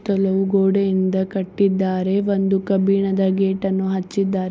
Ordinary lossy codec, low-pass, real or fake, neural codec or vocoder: none; none; real; none